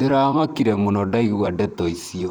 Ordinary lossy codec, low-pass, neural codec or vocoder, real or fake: none; none; vocoder, 44.1 kHz, 128 mel bands, Pupu-Vocoder; fake